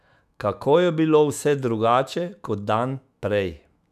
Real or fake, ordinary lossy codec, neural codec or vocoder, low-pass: fake; none; autoencoder, 48 kHz, 128 numbers a frame, DAC-VAE, trained on Japanese speech; 14.4 kHz